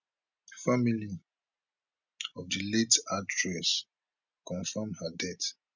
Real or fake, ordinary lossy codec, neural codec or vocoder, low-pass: real; none; none; 7.2 kHz